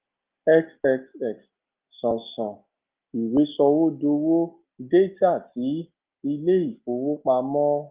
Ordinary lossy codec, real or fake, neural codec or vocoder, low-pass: Opus, 24 kbps; real; none; 3.6 kHz